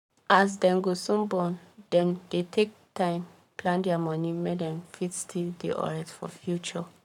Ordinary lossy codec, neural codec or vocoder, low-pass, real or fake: none; codec, 44.1 kHz, 7.8 kbps, Pupu-Codec; 19.8 kHz; fake